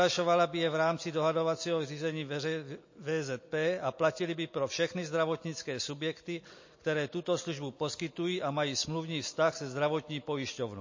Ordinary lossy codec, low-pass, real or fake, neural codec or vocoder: MP3, 32 kbps; 7.2 kHz; real; none